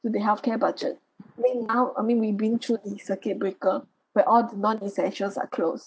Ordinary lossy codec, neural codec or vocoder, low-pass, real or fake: none; none; none; real